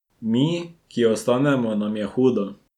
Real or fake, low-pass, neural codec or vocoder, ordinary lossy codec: real; 19.8 kHz; none; none